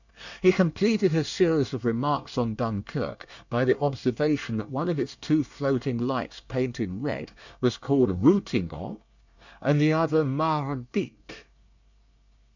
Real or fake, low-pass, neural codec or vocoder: fake; 7.2 kHz; codec, 24 kHz, 1 kbps, SNAC